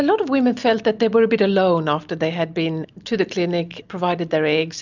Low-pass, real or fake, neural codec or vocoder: 7.2 kHz; real; none